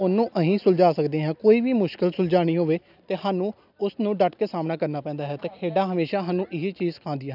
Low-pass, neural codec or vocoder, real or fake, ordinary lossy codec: 5.4 kHz; none; real; none